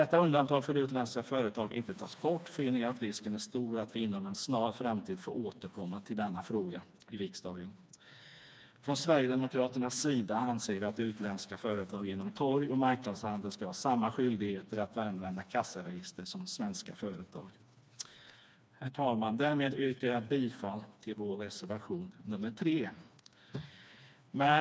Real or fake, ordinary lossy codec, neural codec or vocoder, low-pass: fake; none; codec, 16 kHz, 2 kbps, FreqCodec, smaller model; none